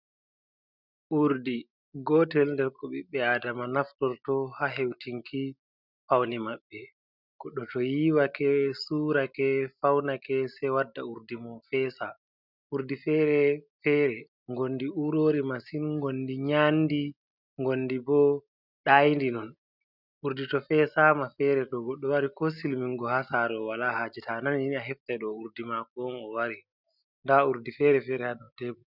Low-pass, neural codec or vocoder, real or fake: 5.4 kHz; none; real